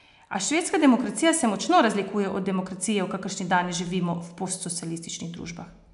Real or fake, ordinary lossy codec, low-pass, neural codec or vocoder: real; MP3, 96 kbps; 10.8 kHz; none